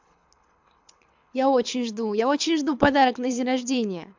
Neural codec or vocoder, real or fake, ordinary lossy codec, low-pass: codec, 24 kHz, 6 kbps, HILCodec; fake; MP3, 64 kbps; 7.2 kHz